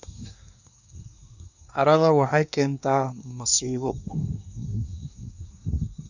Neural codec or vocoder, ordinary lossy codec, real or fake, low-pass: codec, 24 kHz, 1 kbps, SNAC; none; fake; 7.2 kHz